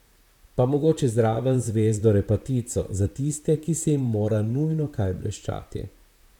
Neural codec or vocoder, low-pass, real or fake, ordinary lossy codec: vocoder, 44.1 kHz, 128 mel bands, Pupu-Vocoder; 19.8 kHz; fake; none